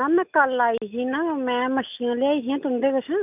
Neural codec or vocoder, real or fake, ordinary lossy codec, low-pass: none; real; none; 3.6 kHz